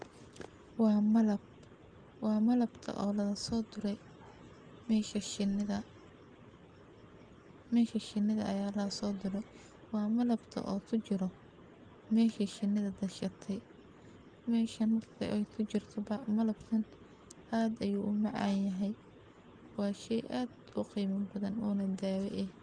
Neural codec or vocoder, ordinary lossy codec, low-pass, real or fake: none; Opus, 24 kbps; 9.9 kHz; real